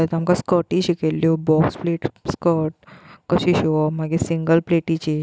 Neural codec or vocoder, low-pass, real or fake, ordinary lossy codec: none; none; real; none